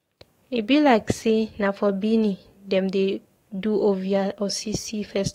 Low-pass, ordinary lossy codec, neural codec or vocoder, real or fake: 19.8 kHz; AAC, 48 kbps; codec, 44.1 kHz, 7.8 kbps, DAC; fake